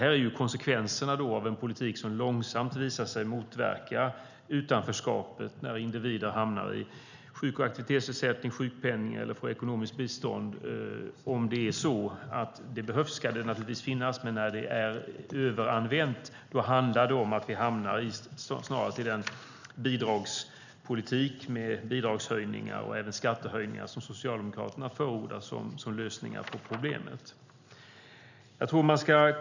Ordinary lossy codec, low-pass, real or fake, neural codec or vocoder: none; 7.2 kHz; real; none